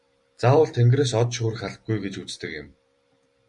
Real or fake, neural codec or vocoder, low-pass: fake; vocoder, 44.1 kHz, 128 mel bands every 256 samples, BigVGAN v2; 10.8 kHz